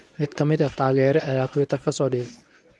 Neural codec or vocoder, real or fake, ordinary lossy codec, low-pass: codec, 24 kHz, 0.9 kbps, WavTokenizer, medium speech release version 1; fake; none; none